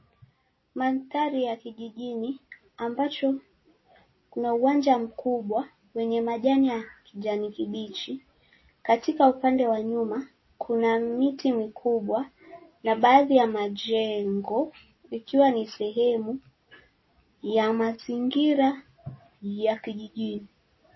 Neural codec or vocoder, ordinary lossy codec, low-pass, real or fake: none; MP3, 24 kbps; 7.2 kHz; real